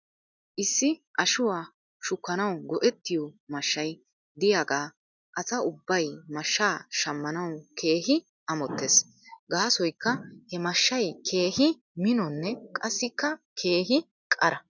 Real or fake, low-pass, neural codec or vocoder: real; 7.2 kHz; none